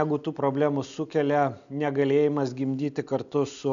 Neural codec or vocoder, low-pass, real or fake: none; 7.2 kHz; real